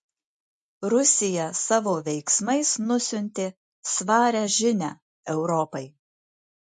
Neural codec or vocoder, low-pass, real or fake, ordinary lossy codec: none; 10.8 kHz; real; MP3, 48 kbps